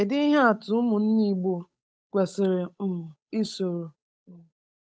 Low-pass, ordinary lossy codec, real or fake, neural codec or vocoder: none; none; fake; codec, 16 kHz, 8 kbps, FunCodec, trained on Chinese and English, 25 frames a second